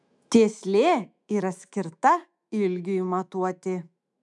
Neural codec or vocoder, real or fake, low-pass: autoencoder, 48 kHz, 128 numbers a frame, DAC-VAE, trained on Japanese speech; fake; 10.8 kHz